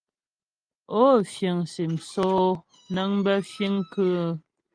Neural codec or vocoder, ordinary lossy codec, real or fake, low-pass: none; Opus, 32 kbps; real; 9.9 kHz